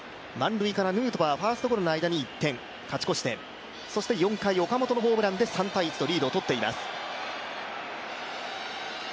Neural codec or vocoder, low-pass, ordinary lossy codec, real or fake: none; none; none; real